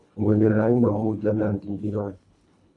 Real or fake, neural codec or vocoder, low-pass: fake; codec, 24 kHz, 1.5 kbps, HILCodec; 10.8 kHz